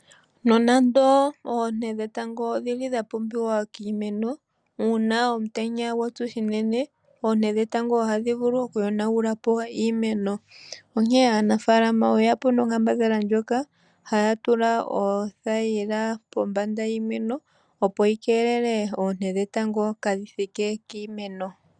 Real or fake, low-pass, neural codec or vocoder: real; 9.9 kHz; none